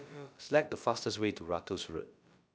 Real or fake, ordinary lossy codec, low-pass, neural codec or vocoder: fake; none; none; codec, 16 kHz, about 1 kbps, DyCAST, with the encoder's durations